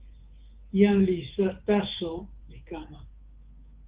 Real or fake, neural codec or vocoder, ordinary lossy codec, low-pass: fake; codec, 24 kHz, 3.1 kbps, DualCodec; Opus, 16 kbps; 3.6 kHz